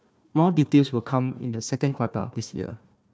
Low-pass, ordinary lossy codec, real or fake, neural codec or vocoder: none; none; fake; codec, 16 kHz, 1 kbps, FunCodec, trained on Chinese and English, 50 frames a second